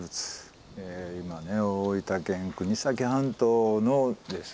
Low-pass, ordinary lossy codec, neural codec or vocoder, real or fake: none; none; none; real